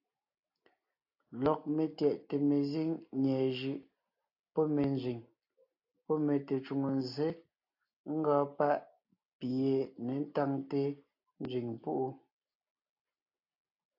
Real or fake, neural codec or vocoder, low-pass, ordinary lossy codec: real; none; 5.4 kHz; AAC, 48 kbps